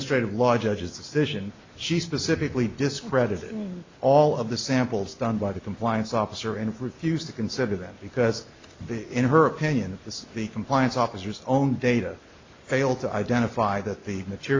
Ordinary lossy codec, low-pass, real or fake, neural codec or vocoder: MP3, 64 kbps; 7.2 kHz; real; none